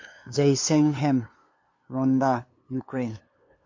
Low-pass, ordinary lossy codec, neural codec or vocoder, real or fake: 7.2 kHz; MP3, 48 kbps; codec, 16 kHz, 2 kbps, FunCodec, trained on LibriTTS, 25 frames a second; fake